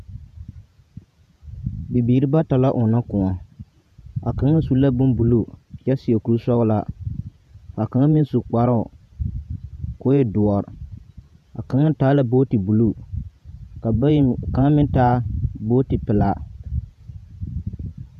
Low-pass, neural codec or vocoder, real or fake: 14.4 kHz; vocoder, 48 kHz, 128 mel bands, Vocos; fake